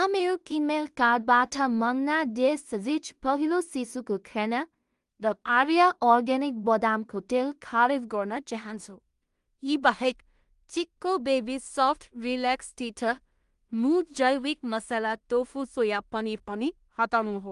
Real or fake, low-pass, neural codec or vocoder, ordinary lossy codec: fake; 10.8 kHz; codec, 16 kHz in and 24 kHz out, 0.4 kbps, LongCat-Audio-Codec, two codebook decoder; Opus, 32 kbps